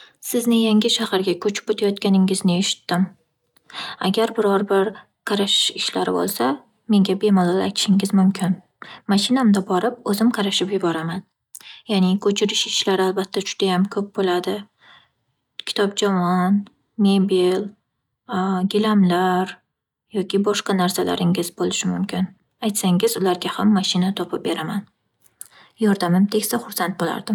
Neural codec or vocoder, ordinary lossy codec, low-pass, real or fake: none; none; 19.8 kHz; real